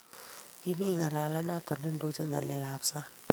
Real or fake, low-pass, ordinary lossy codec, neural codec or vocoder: fake; none; none; codec, 44.1 kHz, 2.6 kbps, SNAC